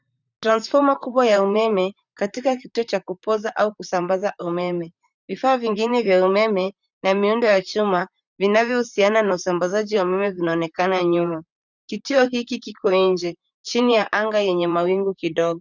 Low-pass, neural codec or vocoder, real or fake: 7.2 kHz; vocoder, 44.1 kHz, 128 mel bands, Pupu-Vocoder; fake